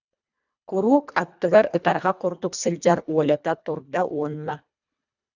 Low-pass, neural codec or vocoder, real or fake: 7.2 kHz; codec, 24 kHz, 1.5 kbps, HILCodec; fake